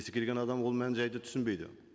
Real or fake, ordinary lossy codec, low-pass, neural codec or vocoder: real; none; none; none